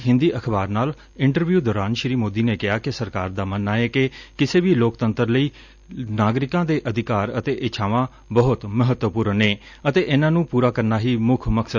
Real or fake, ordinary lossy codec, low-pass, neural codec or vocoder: real; none; 7.2 kHz; none